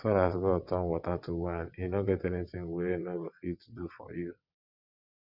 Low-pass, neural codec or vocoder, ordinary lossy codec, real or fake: 5.4 kHz; vocoder, 22.05 kHz, 80 mel bands, WaveNeXt; none; fake